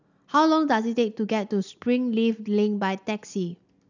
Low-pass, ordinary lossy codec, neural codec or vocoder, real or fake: 7.2 kHz; none; none; real